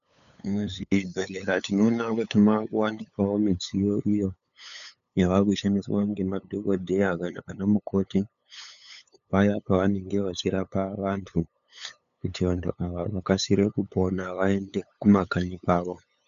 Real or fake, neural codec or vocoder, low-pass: fake; codec, 16 kHz, 8 kbps, FunCodec, trained on LibriTTS, 25 frames a second; 7.2 kHz